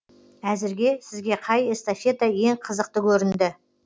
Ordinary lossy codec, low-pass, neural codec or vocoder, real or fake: none; none; none; real